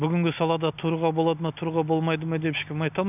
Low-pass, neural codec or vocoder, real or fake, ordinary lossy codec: 3.6 kHz; none; real; none